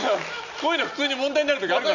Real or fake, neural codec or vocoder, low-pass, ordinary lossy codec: real; none; 7.2 kHz; none